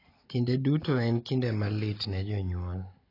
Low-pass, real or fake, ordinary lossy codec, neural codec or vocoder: 5.4 kHz; real; AAC, 24 kbps; none